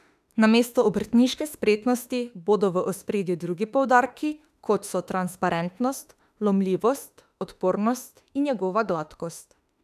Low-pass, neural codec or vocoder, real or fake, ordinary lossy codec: 14.4 kHz; autoencoder, 48 kHz, 32 numbers a frame, DAC-VAE, trained on Japanese speech; fake; none